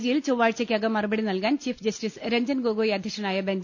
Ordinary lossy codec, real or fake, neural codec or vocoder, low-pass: none; real; none; 7.2 kHz